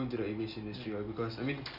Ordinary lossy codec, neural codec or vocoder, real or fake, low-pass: none; none; real; 5.4 kHz